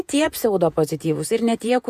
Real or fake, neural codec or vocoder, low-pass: fake; vocoder, 48 kHz, 128 mel bands, Vocos; 14.4 kHz